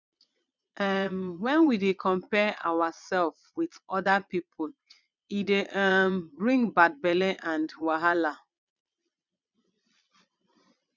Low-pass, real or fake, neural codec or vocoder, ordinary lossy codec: 7.2 kHz; fake; vocoder, 22.05 kHz, 80 mel bands, Vocos; none